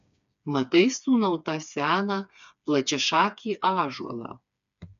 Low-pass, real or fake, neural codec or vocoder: 7.2 kHz; fake; codec, 16 kHz, 4 kbps, FreqCodec, smaller model